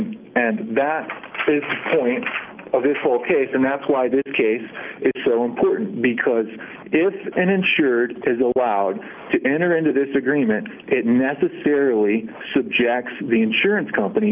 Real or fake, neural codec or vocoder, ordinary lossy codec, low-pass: real; none; Opus, 24 kbps; 3.6 kHz